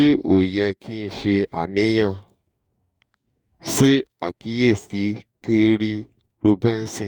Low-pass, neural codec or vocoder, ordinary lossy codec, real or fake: 19.8 kHz; codec, 44.1 kHz, 2.6 kbps, DAC; Opus, 64 kbps; fake